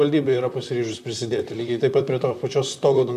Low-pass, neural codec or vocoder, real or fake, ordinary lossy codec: 14.4 kHz; vocoder, 44.1 kHz, 128 mel bands, Pupu-Vocoder; fake; AAC, 96 kbps